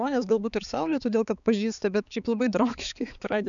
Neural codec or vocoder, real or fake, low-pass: codec, 16 kHz, 4 kbps, X-Codec, HuBERT features, trained on balanced general audio; fake; 7.2 kHz